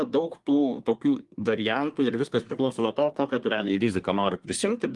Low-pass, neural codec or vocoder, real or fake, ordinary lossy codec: 10.8 kHz; codec, 24 kHz, 1 kbps, SNAC; fake; Opus, 24 kbps